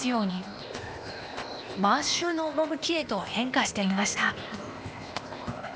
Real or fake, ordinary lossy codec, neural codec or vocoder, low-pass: fake; none; codec, 16 kHz, 0.8 kbps, ZipCodec; none